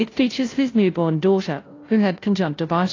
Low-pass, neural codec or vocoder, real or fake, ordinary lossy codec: 7.2 kHz; codec, 16 kHz, 0.5 kbps, FunCodec, trained on Chinese and English, 25 frames a second; fake; AAC, 32 kbps